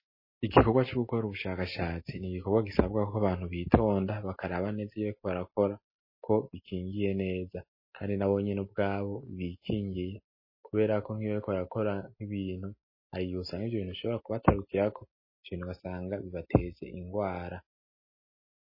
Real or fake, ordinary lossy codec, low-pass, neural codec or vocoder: real; MP3, 24 kbps; 5.4 kHz; none